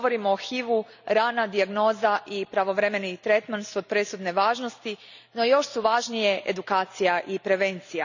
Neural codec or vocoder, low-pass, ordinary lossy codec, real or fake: none; 7.2 kHz; none; real